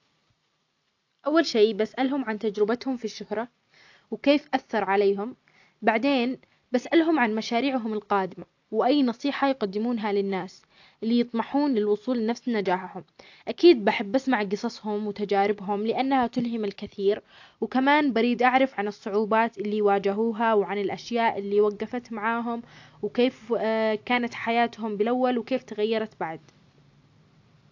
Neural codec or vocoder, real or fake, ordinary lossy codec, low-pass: none; real; AAC, 48 kbps; 7.2 kHz